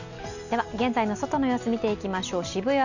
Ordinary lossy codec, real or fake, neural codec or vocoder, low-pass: none; real; none; 7.2 kHz